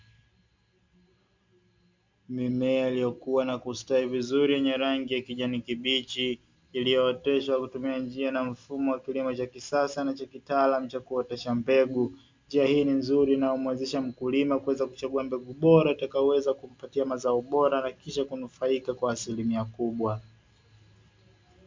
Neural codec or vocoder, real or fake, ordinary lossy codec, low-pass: none; real; MP3, 64 kbps; 7.2 kHz